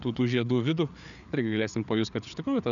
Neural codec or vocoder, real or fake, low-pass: codec, 16 kHz, 4 kbps, FreqCodec, larger model; fake; 7.2 kHz